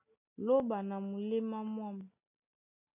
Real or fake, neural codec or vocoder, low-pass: real; none; 3.6 kHz